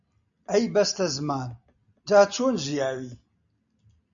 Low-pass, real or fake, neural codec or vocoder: 7.2 kHz; real; none